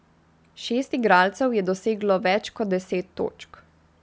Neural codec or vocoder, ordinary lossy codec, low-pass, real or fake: none; none; none; real